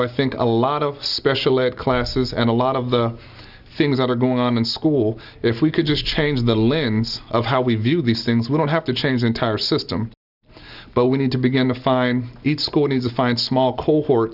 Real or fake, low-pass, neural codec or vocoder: real; 5.4 kHz; none